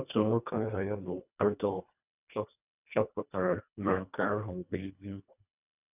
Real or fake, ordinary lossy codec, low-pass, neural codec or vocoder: fake; none; 3.6 kHz; codec, 24 kHz, 1.5 kbps, HILCodec